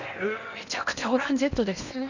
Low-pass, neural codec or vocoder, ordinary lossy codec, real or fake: 7.2 kHz; codec, 16 kHz in and 24 kHz out, 0.8 kbps, FocalCodec, streaming, 65536 codes; none; fake